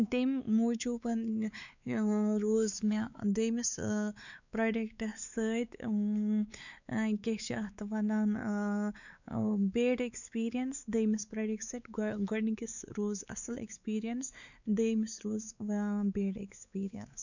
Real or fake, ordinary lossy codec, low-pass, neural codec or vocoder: fake; none; 7.2 kHz; codec, 16 kHz, 4 kbps, X-Codec, WavLM features, trained on Multilingual LibriSpeech